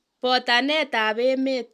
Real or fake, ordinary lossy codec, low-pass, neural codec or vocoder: real; none; 14.4 kHz; none